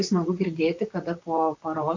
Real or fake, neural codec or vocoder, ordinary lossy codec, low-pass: fake; vocoder, 44.1 kHz, 128 mel bands, Pupu-Vocoder; AAC, 48 kbps; 7.2 kHz